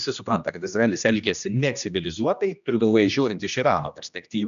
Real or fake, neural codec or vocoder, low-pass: fake; codec, 16 kHz, 1 kbps, X-Codec, HuBERT features, trained on general audio; 7.2 kHz